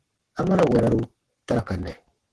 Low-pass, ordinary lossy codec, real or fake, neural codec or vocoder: 10.8 kHz; Opus, 16 kbps; fake; codec, 44.1 kHz, 7.8 kbps, Pupu-Codec